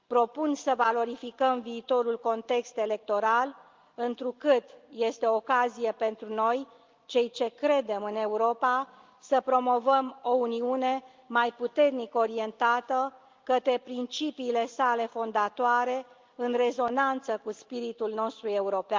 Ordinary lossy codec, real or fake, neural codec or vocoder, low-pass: Opus, 32 kbps; real; none; 7.2 kHz